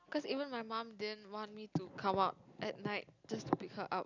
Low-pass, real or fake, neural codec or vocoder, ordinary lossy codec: 7.2 kHz; real; none; none